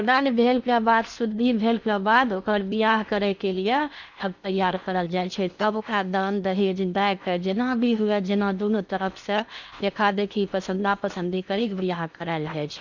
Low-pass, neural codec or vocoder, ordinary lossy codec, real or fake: 7.2 kHz; codec, 16 kHz in and 24 kHz out, 0.8 kbps, FocalCodec, streaming, 65536 codes; none; fake